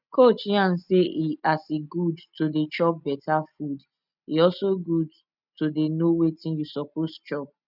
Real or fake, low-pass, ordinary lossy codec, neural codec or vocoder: real; 5.4 kHz; none; none